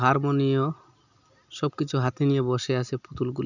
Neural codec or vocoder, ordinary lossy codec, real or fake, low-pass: none; none; real; 7.2 kHz